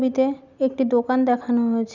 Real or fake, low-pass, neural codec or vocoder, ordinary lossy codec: real; 7.2 kHz; none; none